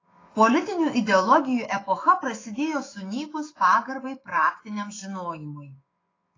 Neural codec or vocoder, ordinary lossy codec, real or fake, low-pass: autoencoder, 48 kHz, 128 numbers a frame, DAC-VAE, trained on Japanese speech; AAC, 32 kbps; fake; 7.2 kHz